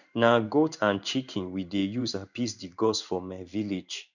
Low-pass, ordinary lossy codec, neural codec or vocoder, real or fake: 7.2 kHz; none; codec, 16 kHz in and 24 kHz out, 1 kbps, XY-Tokenizer; fake